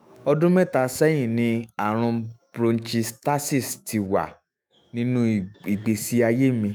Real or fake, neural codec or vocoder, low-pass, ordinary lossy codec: fake; autoencoder, 48 kHz, 128 numbers a frame, DAC-VAE, trained on Japanese speech; none; none